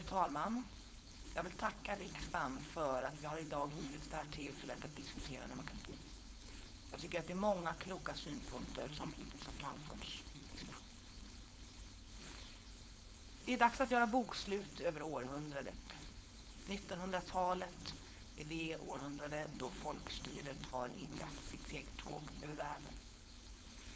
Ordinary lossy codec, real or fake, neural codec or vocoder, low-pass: none; fake; codec, 16 kHz, 4.8 kbps, FACodec; none